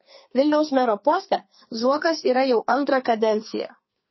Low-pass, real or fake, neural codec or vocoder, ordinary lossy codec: 7.2 kHz; fake; codec, 44.1 kHz, 2.6 kbps, SNAC; MP3, 24 kbps